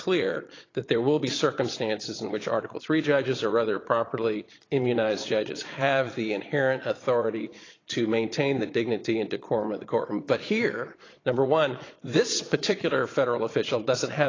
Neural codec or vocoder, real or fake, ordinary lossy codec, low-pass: vocoder, 22.05 kHz, 80 mel bands, Vocos; fake; AAC, 32 kbps; 7.2 kHz